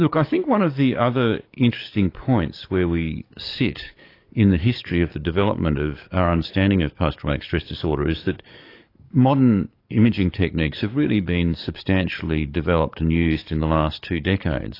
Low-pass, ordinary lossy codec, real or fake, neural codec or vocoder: 5.4 kHz; AAC, 32 kbps; fake; codec, 44.1 kHz, 7.8 kbps, DAC